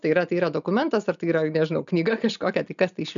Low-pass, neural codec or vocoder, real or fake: 7.2 kHz; none; real